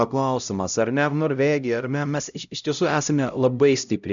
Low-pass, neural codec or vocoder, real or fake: 7.2 kHz; codec, 16 kHz, 0.5 kbps, X-Codec, HuBERT features, trained on LibriSpeech; fake